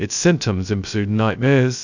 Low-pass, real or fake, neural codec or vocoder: 7.2 kHz; fake; codec, 16 kHz, 0.2 kbps, FocalCodec